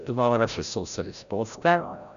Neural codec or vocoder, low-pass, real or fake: codec, 16 kHz, 0.5 kbps, FreqCodec, larger model; 7.2 kHz; fake